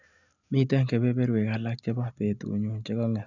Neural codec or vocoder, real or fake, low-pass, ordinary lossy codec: none; real; 7.2 kHz; none